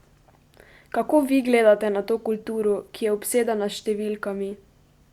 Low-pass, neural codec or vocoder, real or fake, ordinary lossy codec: 19.8 kHz; none; real; Opus, 64 kbps